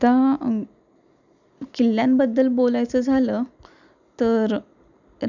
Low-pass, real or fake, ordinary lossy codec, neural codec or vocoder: 7.2 kHz; real; none; none